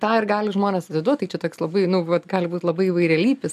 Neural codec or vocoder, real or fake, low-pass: none; real; 14.4 kHz